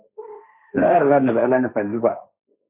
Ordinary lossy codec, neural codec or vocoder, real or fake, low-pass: MP3, 24 kbps; codec, 16 kHz, 1.1 kbps, Voila-Tokenizer; fake; 3.6 kHz